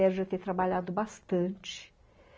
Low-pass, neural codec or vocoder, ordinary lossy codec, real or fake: none; none; none; real